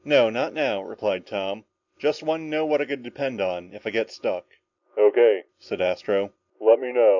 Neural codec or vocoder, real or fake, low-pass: none; real; 7.2 kHz